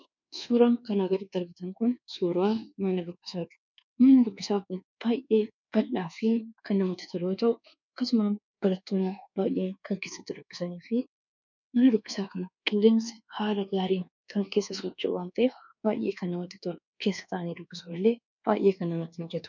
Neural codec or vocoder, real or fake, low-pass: codec, 24 kHz, 1.2 kbps, DualCodec; fake; 7.2 kHz